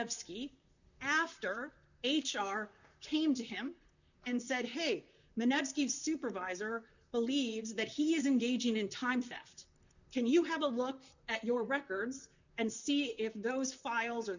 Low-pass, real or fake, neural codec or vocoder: 7.2 kHz; fake; vocoder, 44.1 kHz, 128 mel bands, Pupu-Vocoder